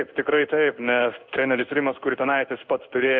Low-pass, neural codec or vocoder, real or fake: 7.2 kHz; codec, 16 kHz in and 24 kHz out, 1 kbps, XY-Tokenizer; fake